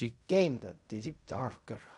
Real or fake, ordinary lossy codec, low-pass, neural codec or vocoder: fake; none; 10.8 kHz; codec, 16 kHz in and 24 kHz out, 0.4 kbps, LongCat-Audio-Codec, fine tuned four codebook decoder